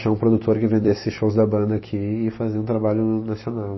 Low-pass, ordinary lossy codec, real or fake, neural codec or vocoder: 7.2 kHz; MP3, 24 kbps; fake; vocoder, 44.1 kHz, 128 mel bands every 256 samples, BigVGAN v2